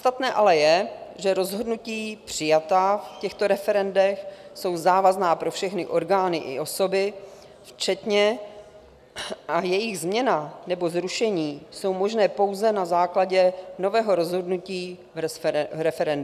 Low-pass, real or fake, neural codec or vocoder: 14.4 kHz; real; none